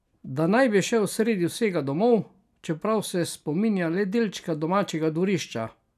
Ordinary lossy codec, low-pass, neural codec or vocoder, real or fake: none; 14.4 kHz; none; real